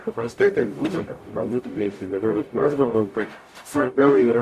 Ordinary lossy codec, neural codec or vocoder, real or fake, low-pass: MP3, 96 kbps; codec, 44.1 kHz, 0.9 kbps, DAC; fake; 14.4 kHz